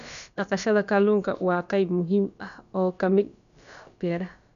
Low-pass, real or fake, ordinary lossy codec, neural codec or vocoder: 7.2 kHz; fake; none; codec, 16 kHz, about 1 kbps, DyCAST, with the encoder's durations